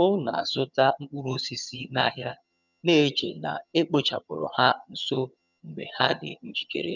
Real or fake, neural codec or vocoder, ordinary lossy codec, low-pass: fake; vocoder, 22.05 kHz, 80 mel bands, HiFi-GAN; none; 7.2 kHz